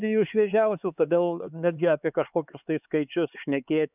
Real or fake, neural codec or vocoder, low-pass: fake; codec, 16 kHz, 4 kbps, X-Codec, HuBERT features, trained on LibriSpeech; 3.6 kHz